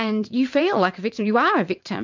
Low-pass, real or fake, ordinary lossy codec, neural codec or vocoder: 7.2 kHz; fake; MP3, 64 kbps; vocoder, 22.05 kHz, 80 mel bands, WaveNeXt